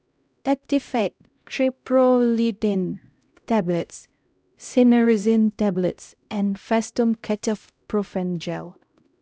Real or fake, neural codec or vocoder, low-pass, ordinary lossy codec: fake; codec, 16 kHz, 0.5 kbps, X-Codec, HuBERT features, trained on LibriSpeech; none; none